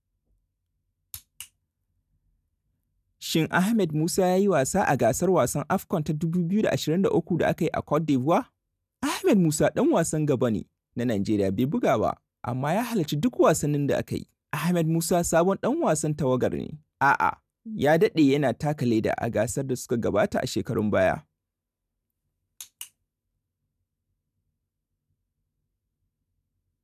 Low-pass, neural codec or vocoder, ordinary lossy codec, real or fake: 14.4 kHz; none; none; real